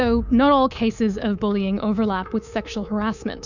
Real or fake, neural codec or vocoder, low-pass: fake; codec, 24 kHz, 3.1 kbps, DualCodec; 7.2 kHz